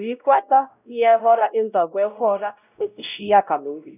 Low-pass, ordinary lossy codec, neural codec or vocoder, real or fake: 3.6 kHz; none; codec, 16 kHz, 0.5 kbps, X-Codec, WavLM features, trained on Multilingual LibriSpeech; fake